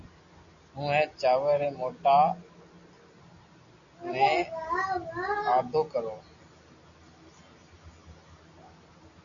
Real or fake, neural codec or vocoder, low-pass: real; none; 7.2 kHz